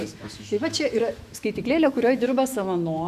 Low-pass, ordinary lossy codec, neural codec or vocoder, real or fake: 14.4 kHz; Opus, 64 kbps; autoencoder, 48 kHz, 128 numbers a frame, DAC-VAE, trained on Japanese speech; fake